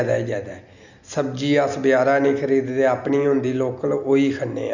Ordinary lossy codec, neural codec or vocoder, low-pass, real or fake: none; none; 7.2 kHz; real